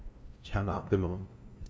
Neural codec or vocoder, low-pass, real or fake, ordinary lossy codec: codec, 16 kHz, 0.5 kbps, FunCodec, trained on LibriTTS, 25 frames a second; none; fake; none